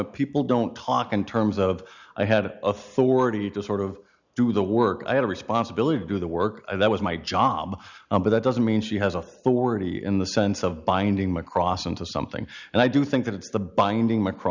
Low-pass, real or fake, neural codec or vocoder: 7.2 kHz; real; none